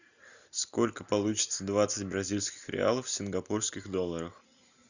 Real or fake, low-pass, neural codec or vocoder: real; 7.2 kHz; none